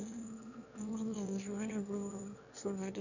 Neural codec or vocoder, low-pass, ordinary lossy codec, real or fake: autoencoder, 22.05 kHz, a latent of 192 numbers a frame, VITS, trained on one speaker; 7.2 kHz; none; fake